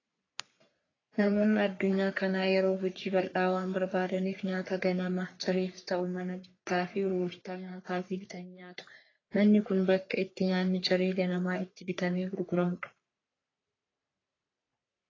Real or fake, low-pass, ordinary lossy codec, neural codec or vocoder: fake; 7.2 kHz; AAC, 32 kbps; codec, 44.1 kHz, 3.4 kbps, Pupu-Codec